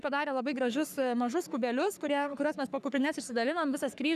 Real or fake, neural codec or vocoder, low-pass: fake; codec, 44.1 kHz, 3.4 kbps, Pupu-Codec; 14.4 kHz